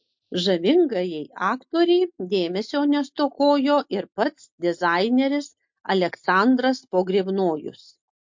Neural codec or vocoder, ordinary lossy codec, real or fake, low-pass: none; MP3, 48 kbps; real; 7.2 kHz